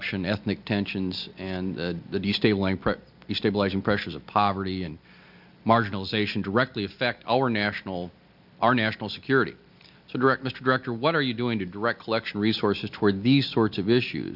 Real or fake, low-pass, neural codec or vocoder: real; 5.4 kHz; none